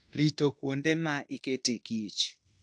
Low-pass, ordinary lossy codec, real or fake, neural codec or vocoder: 9.9 kHz; AAC, 64 kbps; fake; codec, 16 kHz in and 24 kHz out, 0.9 kbps, LongCat-Audio-Codec, fine tuned four codebook decoder